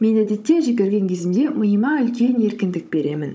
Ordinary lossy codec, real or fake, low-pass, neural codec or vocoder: none; fake; none; codec, 16 kHz, 16 kbps, FunCodec, trained on Chinese and English, 50 frames a second